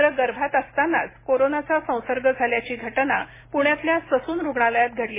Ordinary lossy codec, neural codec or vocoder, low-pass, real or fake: MP3, 16 kbps; none; 3.6 kHz; real